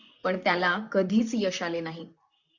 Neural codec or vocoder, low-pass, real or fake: vocoder, 44.1 kHz, 128 mel bands, Pupu-Vocoder; 7.2 kHz; fake